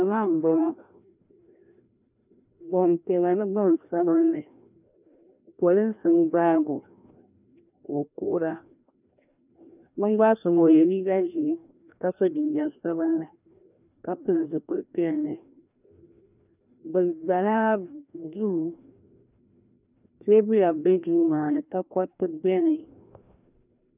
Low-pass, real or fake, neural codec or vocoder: 3.6 kHz; fake; codec, 16 kHz, 1 kbps, FreqCodec, larger model